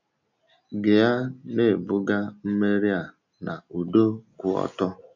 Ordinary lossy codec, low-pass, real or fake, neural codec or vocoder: none; 7.2 kHz; real; none